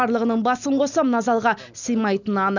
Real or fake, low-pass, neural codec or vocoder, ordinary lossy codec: real; 7.2 kHz; none; none